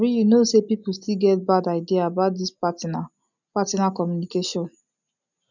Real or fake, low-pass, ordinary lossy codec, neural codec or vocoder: real; 7.2 kHz; none; none